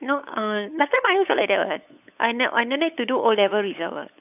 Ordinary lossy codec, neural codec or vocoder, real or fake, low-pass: none; codec, 16 kHz, 8 kbps, FunCodec, trained on LibriTTS, 25 frames a second; fake; 3.6 kHz